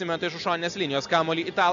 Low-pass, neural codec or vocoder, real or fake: 7.2 kHz; none; real